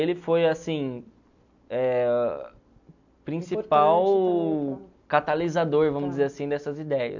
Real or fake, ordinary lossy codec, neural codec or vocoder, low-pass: real; none; none; 7.2 kHz